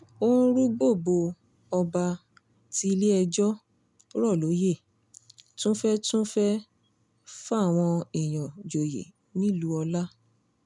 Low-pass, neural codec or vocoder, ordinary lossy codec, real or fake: 10.8 kHz; none; none; real